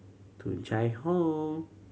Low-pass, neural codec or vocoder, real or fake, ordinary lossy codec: none; none; real; none